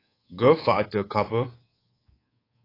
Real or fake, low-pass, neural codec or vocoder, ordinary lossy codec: fake; 5.4 kHz; codec, 24 kHz, 3.1 kbps, DualCodec; AAC, 24 kbps